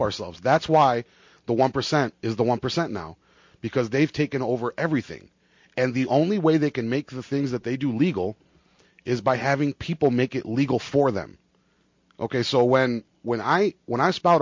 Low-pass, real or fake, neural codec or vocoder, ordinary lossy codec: 7.2 kHz; real; none; MP3, 48 kbps